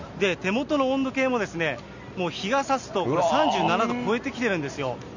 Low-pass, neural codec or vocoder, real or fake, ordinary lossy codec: 7.2 kHz; none; real; none